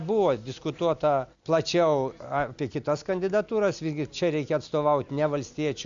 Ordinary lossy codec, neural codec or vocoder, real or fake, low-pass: Opus, 64 kbps; none; real; 7.2 kHz